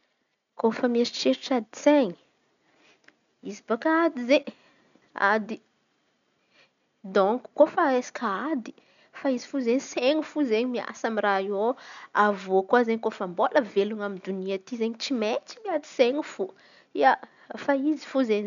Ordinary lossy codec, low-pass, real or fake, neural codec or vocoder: MP3, 96 kbps; 7.2 kHz; real; none